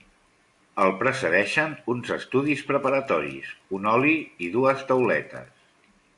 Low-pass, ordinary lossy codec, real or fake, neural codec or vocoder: 10.8 kHz; AAC, 64 kbps; real; none